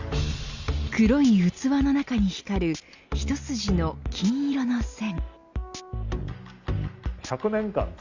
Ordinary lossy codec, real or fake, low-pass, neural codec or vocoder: Opus, 64 kbps; real; 7.2 kHz; none